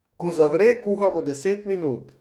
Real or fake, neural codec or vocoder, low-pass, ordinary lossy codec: fake; codec, 44.1 kHz, 2.6 kbps, DAC; 19.8 kHz; none